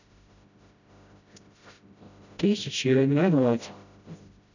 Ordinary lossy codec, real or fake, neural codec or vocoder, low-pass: none; fake; codec, 16 kHz, 0.5 kbps, FreqCodec, smaller model; 7.2 kHz